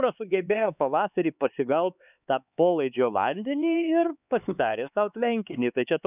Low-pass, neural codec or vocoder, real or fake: 3.6 kHz; codec, 16 kHz, 4 kbps, X-Codec, HuBERT features, trained on LibriSpeech; fake